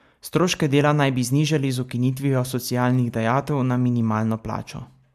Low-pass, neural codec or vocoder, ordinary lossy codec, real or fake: 14.4 kHz; none; MP3, 96 kbps; real